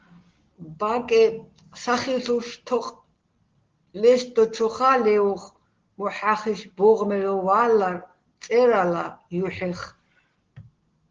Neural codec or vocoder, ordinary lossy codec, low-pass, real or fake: none; Opus, 16 kbps; 7.2 kHz; real